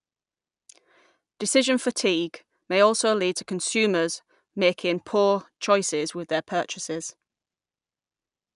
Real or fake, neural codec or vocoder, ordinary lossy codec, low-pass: real; none; none; 10.8 kHz